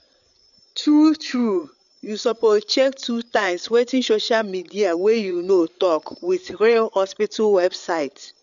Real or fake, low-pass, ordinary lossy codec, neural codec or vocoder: fake; 7.2 kHz; none; codec, 16 kHz, 8 kbps, FreqCodec, larger model